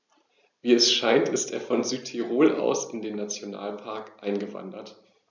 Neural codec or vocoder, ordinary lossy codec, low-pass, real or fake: none; none; 7.2 kHz; real